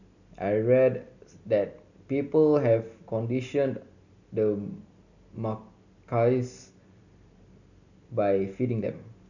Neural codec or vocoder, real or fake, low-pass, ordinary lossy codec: none; real; 7.2 kHz; none